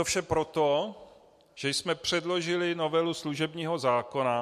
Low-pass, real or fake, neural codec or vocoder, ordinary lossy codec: 14.4 kHz; real; none; MP3, 64 kbps